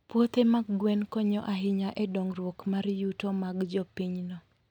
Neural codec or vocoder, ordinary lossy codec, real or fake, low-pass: none; none; real; 19.8 kHz